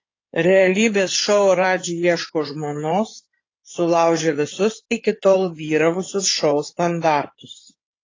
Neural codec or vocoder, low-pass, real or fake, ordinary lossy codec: codec, 16 kHz in and 24 kHz out, 2.2 kbps, FireRedTTS-2 codec; 7.2 kHz; fake; AAC, 32 kbps